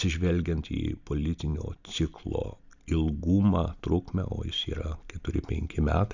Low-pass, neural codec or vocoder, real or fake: 7.2 kHz; none; real